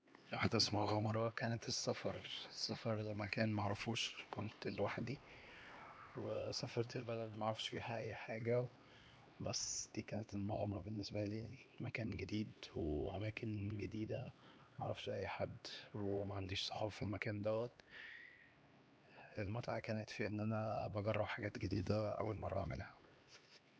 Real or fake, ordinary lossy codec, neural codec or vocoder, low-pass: fake; none; codec, 16 kHz, 2 kbps, X-Codec, HuBERT features, trained on LibriSpeech; none